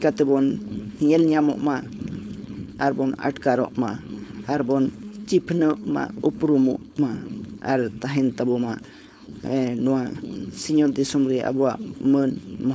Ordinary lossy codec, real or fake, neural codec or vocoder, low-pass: none; fake; codec, 16 kHz, 4.8 kbps, FACodec; none